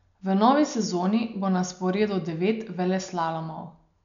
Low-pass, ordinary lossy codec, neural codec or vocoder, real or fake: 7.2 kHz; none; none; real